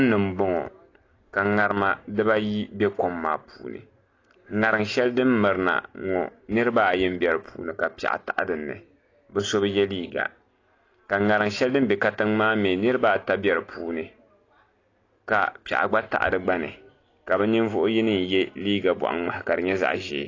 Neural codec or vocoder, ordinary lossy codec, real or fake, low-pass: none; AAC, 32 kbps; real; 7.2 kHz